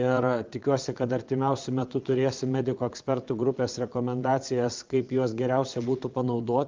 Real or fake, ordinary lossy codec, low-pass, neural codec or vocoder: fake; Opus, 24 kbps; 7.2 kHz; vocoder, 22.05 kHz, 80 mel bands, Vocos